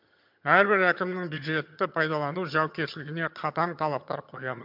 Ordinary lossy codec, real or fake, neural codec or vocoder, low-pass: MP3, 48 kbps; fake; vocoder, 22.05 kHz, 80 mel bands, HiFi-GAN; 5.4 kHz